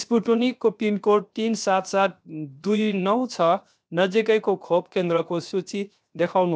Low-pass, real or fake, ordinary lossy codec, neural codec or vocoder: none; fake; none; codec, 16 kHz, 0.7 kbps, FocalCodec